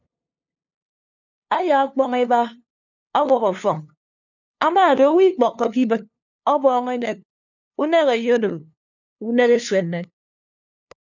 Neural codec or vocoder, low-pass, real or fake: codec, 16 kHz, 2 kbps, FunCodec, trained on LibriTTS, 25 frames a second; 7.2 kHz; fake